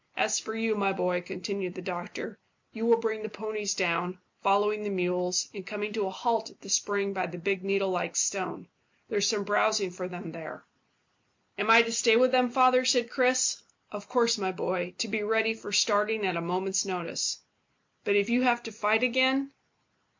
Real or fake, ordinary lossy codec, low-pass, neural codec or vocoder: real; MP3, 48 kbps; 7.2 kHz; none